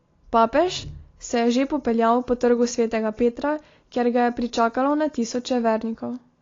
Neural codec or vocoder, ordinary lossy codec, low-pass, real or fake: none; AAC, 32 kbps; 7.2 kHz; real